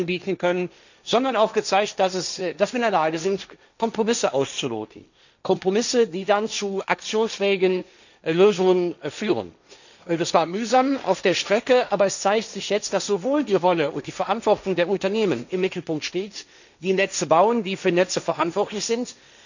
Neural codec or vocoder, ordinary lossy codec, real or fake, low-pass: codec, 16 kHz, 1.1 kbps, Voila-Tokenizer; none; fake; 7.2 kHz